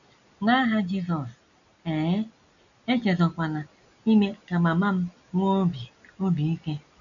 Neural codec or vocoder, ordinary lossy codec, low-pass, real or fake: none; none; 7.2 kHz; real